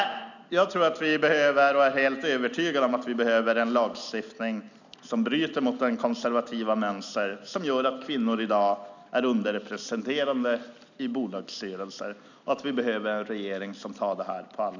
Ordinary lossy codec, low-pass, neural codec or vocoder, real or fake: none; 7.2 kHz; none; real